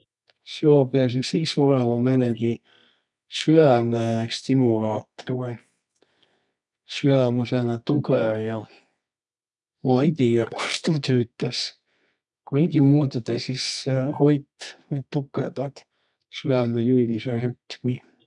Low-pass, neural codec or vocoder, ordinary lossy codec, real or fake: 10.8 kHz; codec, 24 kHz, 0.9 kbps, WavTokenizer, medium music audio release; none; fake